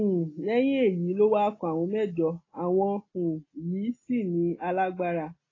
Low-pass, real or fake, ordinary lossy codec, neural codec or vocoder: 7.2 kHz; real; AAC, 32 kbps; none